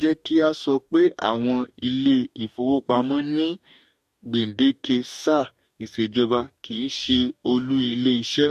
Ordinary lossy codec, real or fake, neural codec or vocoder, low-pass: MP3, 64 kbps; fake; codec, 44.1 kHz, 2.6 kbps, DAC; 14.4 kHz